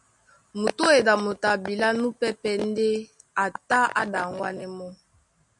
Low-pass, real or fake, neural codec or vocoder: 10.8 kHz; real; none